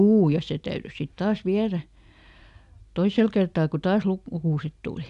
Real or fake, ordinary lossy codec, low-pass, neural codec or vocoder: real; MP3, 96 kbps; 10.8 kHz; none